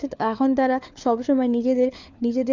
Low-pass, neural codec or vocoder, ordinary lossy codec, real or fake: 7.2 kHz; codec, 16 kHz, 4 kbps, FunCodec, trained on LibriTTS, 50 frames a second; Opus, 64 kbps; fake